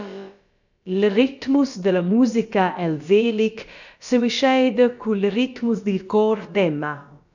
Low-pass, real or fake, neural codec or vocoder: 7.2 kHz; fake; codec, 16 kHz, about 1 kbps, DyCAST, with the encoder's durations